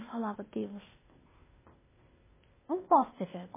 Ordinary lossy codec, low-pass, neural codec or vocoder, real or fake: MP3, 16 kbps; 3.6 kHz; codec, 16 kHz, 0.8 kbps, ZipCodec; fake